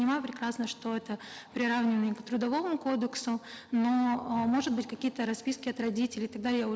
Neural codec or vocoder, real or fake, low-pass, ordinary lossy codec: none; real; none; none